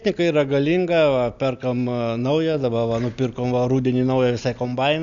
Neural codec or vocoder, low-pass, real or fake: none; 7.2 kHz; real